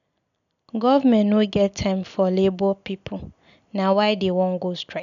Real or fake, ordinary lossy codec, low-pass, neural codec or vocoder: real; none; 7.2 kHz; none